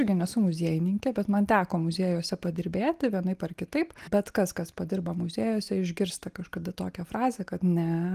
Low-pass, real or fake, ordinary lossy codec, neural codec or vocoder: 14.4 kHz; real; Opus, 24 kbps; none